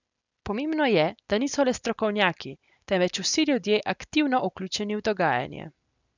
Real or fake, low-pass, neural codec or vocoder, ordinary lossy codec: real; 7.2 kHz; none; none